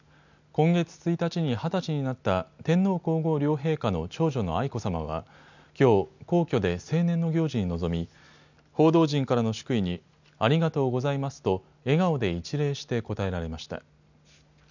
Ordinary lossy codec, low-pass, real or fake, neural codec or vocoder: none; 7.2 kHz; real; none